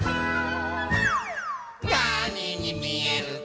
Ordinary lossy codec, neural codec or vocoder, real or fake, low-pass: none; none; real; none